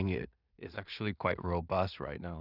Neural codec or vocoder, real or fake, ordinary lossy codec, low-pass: codec, 16 kHz in and 24 kHz out, 0.4 kbps, LongCat-Audio-Codec, two codebook decoder; fake; none; 5.4 kHz